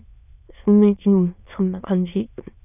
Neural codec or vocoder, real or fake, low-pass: autoencoder, 22.05 kHz, a latent of 192 numbers a frame, VITS, trained on many speakers; fake; 3.6 kHz